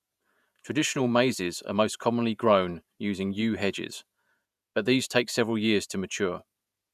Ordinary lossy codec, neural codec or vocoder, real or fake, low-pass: none; vocoder, 48 kHz, 128 mel bands, Vocos; fake; 14.4 kHz